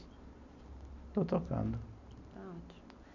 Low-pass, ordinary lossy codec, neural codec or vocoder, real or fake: 7.2 kHz; none; none; real